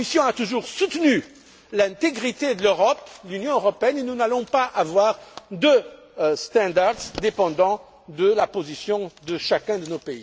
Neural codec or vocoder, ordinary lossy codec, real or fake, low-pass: none; none; real; none